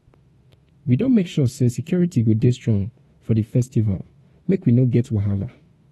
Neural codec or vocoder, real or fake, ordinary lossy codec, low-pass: autoencoder, 48 kHz, 32 numbers a frame, DAC-VAE, trained on Japanese speech; fake; AAC, 32 kbps; 19.8 kHz